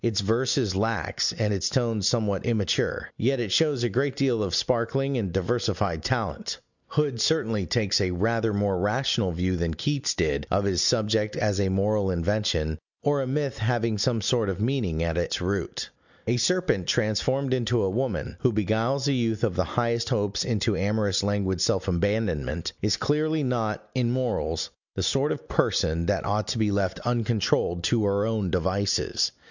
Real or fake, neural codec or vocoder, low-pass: real; none; 7.2 kHz